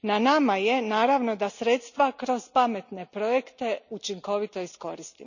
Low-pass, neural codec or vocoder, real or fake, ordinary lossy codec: 7.2 kHz; none; real; none